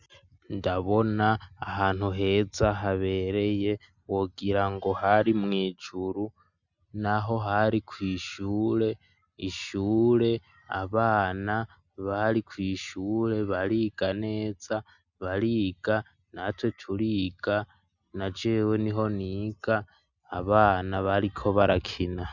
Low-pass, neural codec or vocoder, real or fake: 7.2 kHz; none; real